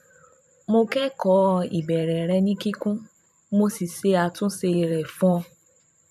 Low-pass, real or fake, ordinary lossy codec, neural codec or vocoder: 14.4 kHz; fake; none; vocoder, 44.1 kHz, 128 mel bands every 512 samples, BigVGAN v2